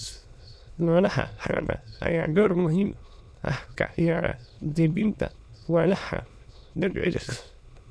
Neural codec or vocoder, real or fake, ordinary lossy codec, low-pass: autoencoder, 22.05 kHz, a latent of 192 numbers a frame, VITS, trained on many speakers; fake; none; none